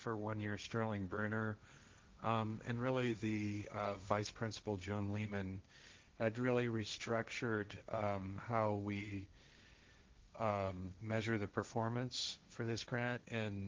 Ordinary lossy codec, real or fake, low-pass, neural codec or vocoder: Opus, 24 kbps; fake; 7.2 kHz; codec, 16 kHz, 1.1 kbps, Voila-Tokenizer